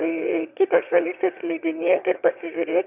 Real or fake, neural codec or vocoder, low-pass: fake; autoencoder, 22.05 kHz, a latent of 192 numbers a frame, VITS, trained on one speaker; 3.6 kHz